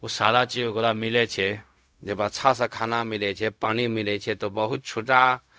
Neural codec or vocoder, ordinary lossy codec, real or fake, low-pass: codec, 16 kHz, 0.4 kbps, LongCat-Audio-Codec; none; fake; none